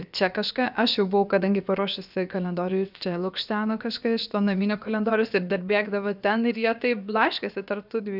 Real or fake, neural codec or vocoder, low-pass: fake; codec, 16 kHz, about 1 kbps, DyCAST, with the encoder's durations; 5.4 kHz